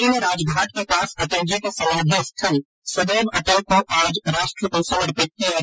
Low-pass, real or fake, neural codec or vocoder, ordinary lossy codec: none; real; none; none